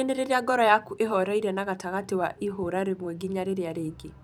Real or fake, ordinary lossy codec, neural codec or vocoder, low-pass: fake; none; vocoder, 44.1 kHz, 128 mel bands every 512 samples, BigVGAN v2; none